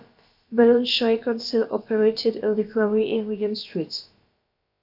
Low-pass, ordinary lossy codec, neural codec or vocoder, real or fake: 5.4 kHz; AAC, 48 kbps; codec, 16 kHz, about 1 kbps, DyCAST, with the encoder's durations; fake